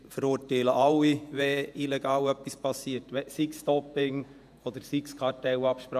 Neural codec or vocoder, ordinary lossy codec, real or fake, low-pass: vocoder, 48 kHz, 128 mel bands, Vocos; none; fake; 14.4 kHz